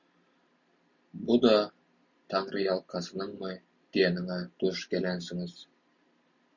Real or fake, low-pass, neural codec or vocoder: real; 7.2 kHz; none